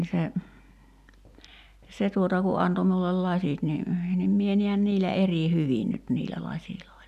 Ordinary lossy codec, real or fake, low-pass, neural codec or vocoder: none; real; 14.4 kHz; none